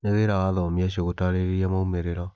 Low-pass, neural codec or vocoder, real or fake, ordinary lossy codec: none; none; real; none